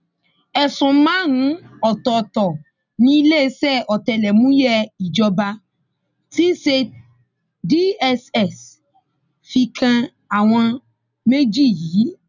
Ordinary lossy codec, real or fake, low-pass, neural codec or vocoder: none; real; 7.2 kHz; none